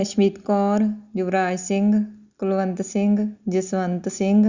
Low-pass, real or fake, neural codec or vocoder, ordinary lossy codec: 7.2 kHz; real; none; Opus, 64 kbps